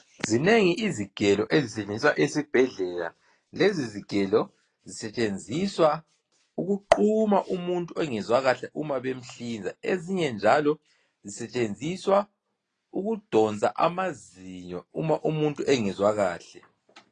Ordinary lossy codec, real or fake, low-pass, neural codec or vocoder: AAC, 32 kbps; real; 9.9 kHz; none